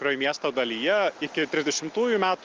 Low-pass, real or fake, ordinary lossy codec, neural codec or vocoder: 7.2 kHz; real; Opus, 32 kbps; none